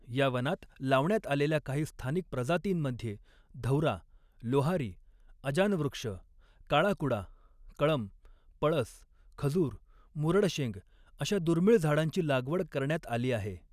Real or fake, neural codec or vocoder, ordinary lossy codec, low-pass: real; none; none; 14.4 kHz